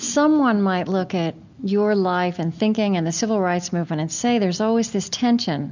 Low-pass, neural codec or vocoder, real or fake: 7.2 kHz; none; real